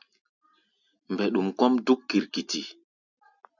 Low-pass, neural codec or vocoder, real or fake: 7.2 kHz; none; real